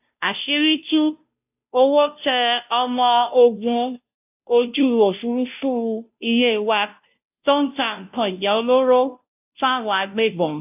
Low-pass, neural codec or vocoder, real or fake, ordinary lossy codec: 3.6 kHz; codec, 16 kHz, 0.5 kbps, FunCodec, trained on Chinese and English, 25 frames a second; fake; AAC, 32 kbps